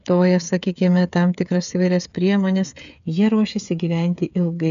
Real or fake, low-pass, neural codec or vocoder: fake; 7.2 kHz; codec, 16 kHz, 8 kbps, FreqCodec, smaller model